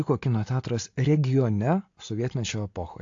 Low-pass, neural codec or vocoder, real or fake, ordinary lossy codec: 7.2 kHz; none; real; AAC, 48 kbps